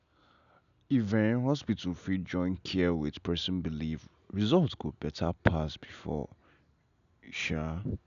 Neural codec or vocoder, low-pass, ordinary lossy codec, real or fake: none; 7.2 kHz; none; real